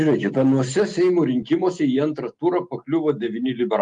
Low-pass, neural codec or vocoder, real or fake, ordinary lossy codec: 10.8 kHz; none; real; Opus, 32 kbps